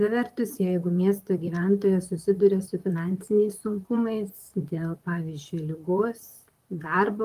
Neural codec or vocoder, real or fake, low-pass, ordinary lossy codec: vocoder, 44.1 kHz, 128 mel bands, Pupu-Vocoder; fake; 14.4 kHz; Opus, 32 kbps